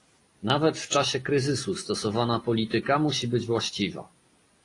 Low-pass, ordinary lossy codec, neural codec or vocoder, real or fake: 10.8 kHz; AAC, 32 kbps; none; real